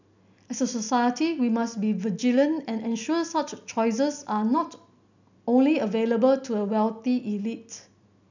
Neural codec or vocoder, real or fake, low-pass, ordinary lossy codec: none; real; 7.2 kHz; none